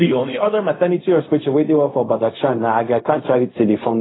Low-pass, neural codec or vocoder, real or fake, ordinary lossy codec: 7.2 kHz; codec, 16 kHz, 0.4 kbps, LongCat-Audio-Codec; fake; AAC, 16 kbps